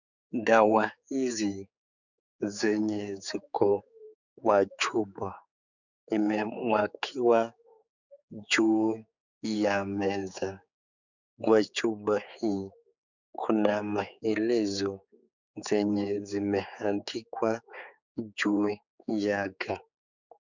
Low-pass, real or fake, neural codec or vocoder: 7.2 kHz; fake; codec, 16 kHz, 4 kbps, X-Codec, HuBERT features, trained on general audio